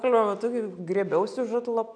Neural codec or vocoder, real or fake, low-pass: none; real; 9.9 kHz